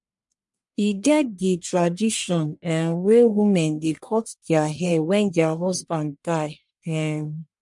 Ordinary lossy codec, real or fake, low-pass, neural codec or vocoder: MP3, 64 kbps; fake; 10.8 kHz; codec, 44.1 kHz, 1.7 kbps, Pupu-Codec